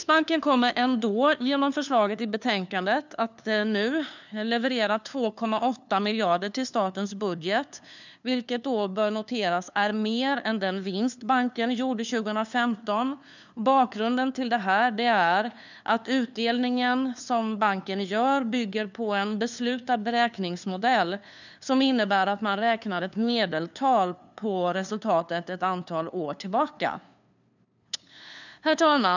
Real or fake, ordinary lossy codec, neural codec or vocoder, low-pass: fake; none; codec, 16 kHz, 2 kbps, FunCodec, trained on LibriTTS, 25 frames a second; 7.2 kHz